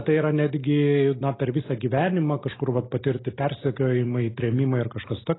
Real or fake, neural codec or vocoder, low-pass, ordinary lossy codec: real; none; 7.2 kHz; AAC, 16 kbps